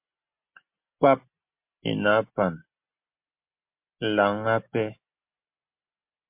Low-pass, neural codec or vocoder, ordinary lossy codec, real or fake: 3.6 kHz; none; MP3, 32 kbps; real